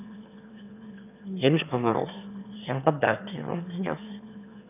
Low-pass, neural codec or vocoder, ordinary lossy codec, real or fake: 3.6 kHz; autoencoder, 22.05 kHz, a latent of 192 numbers a frame, VITS, trained on one speaker; none; fake